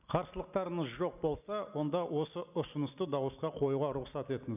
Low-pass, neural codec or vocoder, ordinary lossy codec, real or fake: 3.6 kHz; none; none; real